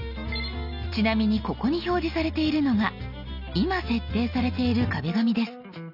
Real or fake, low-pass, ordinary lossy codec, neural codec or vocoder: real; 5.4 kHz; none; none